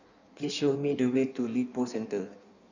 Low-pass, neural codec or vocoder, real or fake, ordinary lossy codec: 7.2 kHz; codec, 16 kHz in and 24 kHz out, 1.1 kbps, FireRedTTS-2 codec; fake; none